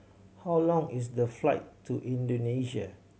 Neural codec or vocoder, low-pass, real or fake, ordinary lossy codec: none; none; real; none